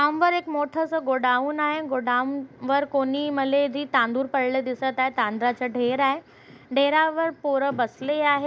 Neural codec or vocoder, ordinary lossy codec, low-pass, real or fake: none; none; none; real